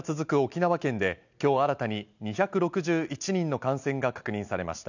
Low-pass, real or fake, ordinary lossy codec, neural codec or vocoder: 7.2 kHz; real; none; none